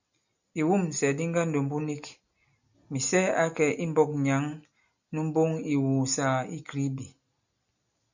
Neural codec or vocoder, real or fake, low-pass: none; real; 7.2 kHz